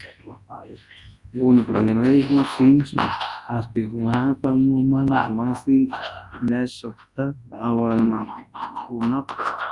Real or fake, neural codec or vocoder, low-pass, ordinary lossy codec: fake; codec, 24 kHz, 0.9 kbps, WavTokenizer, large speech release; 10.8 kHz; Opus, 64 kbps